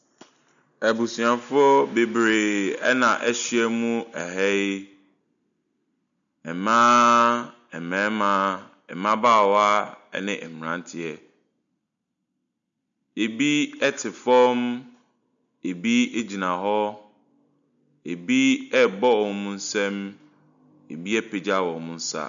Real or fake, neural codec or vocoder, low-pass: real; none; 7.2 kHz